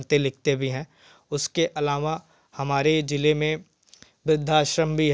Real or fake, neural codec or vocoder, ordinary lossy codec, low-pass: real; none; none; none